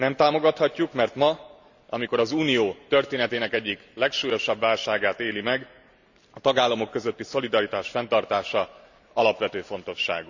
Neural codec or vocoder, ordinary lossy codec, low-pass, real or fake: none; none; 7.2 kHz; real